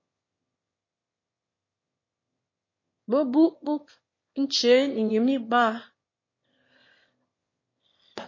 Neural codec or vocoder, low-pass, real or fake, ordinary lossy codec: autoencoder, 22.05 kHz, a latent of 192 numbers a frame, VITS, trained on one speaker; 7.2 kHz; fake; MP3, 32 kbps